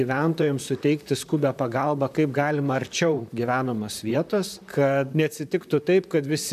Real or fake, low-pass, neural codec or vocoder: fake; 14.4 kHz; vocoder, 44.1 kHz, 128 mel bands, Pupu-Vocoder